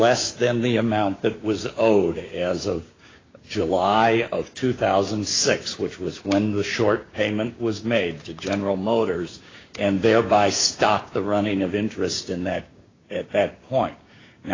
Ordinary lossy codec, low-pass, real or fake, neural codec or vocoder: AAC, 32 kbps; 7.2 kHz; fake; codec, 16 kHz, 6 kbps, DAC